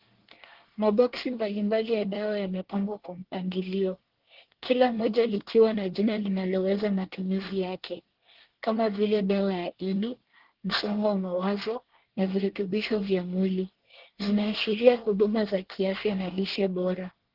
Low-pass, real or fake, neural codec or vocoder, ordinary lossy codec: 5.4 kHz; fake; codec, 24 kHz, 1 kbps, SNAC; Opus, 16 kbps